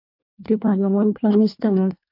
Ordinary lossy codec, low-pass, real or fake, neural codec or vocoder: MP3, 48 kbps; 5.4 kHz; fake; codec, 24 kHz, 1 kbps, SNAC